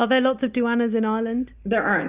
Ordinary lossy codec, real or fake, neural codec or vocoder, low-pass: Opus, 64 kbps; real; none; 3.6 kHz